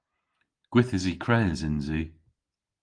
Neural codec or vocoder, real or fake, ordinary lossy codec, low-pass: none; real; Opus, 24 kbps; 9.9 kHz